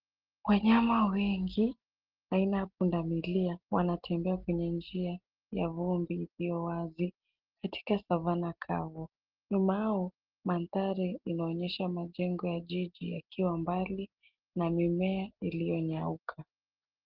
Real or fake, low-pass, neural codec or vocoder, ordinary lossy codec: real; 5.4 kHz; none; Opus, 16 kbps